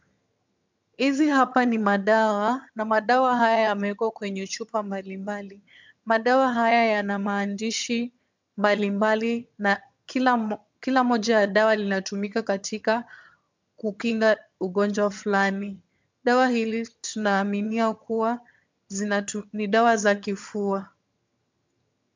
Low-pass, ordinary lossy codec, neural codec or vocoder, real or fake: 7.2 kHz; MP3, 64 kbps; vocoder, 22.05 kHz, 80 mel bands, HiFi-GAN; fake